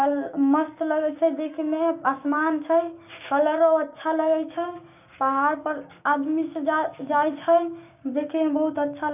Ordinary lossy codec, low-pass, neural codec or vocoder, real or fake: none; 3.6 kHz; codec, 16 kHz, 6 kbps, DAC; fake